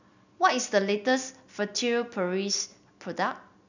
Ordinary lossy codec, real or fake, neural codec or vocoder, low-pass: none; real; none; 7.2 kHz